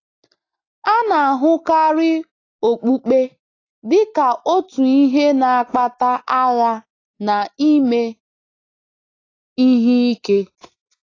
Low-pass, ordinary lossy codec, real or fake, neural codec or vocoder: 7.2 kHz; AAC, 32 kbps; real; none